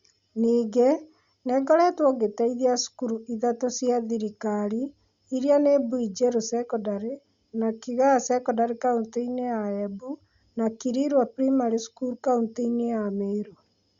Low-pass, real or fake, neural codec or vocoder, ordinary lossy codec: 7.2 kHz; real; none; Opus, 64 kbps